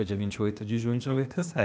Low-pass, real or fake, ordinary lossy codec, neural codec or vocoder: none; fake; none; codec, 16 kHz, 0.8 kbps, ZipCodec